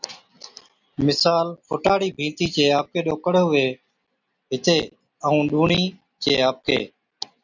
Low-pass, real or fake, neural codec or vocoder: 7.2 kHz; real; none